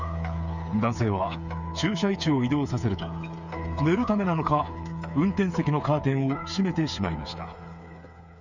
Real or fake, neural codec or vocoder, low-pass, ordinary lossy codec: fake; codec, 16 kHz, 8 kbps, FreqCodec, smaller model; 7.2 kHz; none